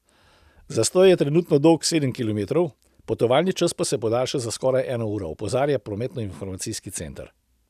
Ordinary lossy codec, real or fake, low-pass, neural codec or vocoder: none; real; 14.4 kHz; none